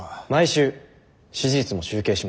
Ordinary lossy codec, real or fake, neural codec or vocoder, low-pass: none; real; none; none